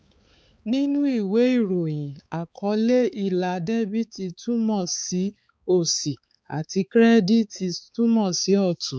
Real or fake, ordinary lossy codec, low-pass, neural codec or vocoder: fake; none; none; codec, 16 kHz, 4 kbps, X-Codec, HuBERT features, trained on balanced general audio